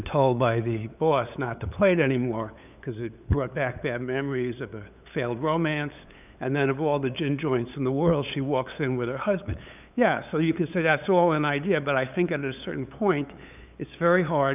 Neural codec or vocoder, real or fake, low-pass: codec, 16 kHz, 8 kbps, FunCodec, trained on LibriTTS, 25 frames a second; fake; 3.6 kHz